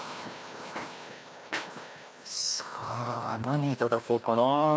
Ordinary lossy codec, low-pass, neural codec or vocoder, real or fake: none; none; codec, 16 kHz, 1 kbps, FreqCodec, larger model; fake